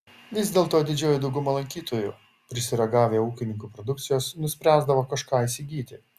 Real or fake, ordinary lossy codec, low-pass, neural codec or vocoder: real; Opus, 64 kbps; 14.4 kHz; none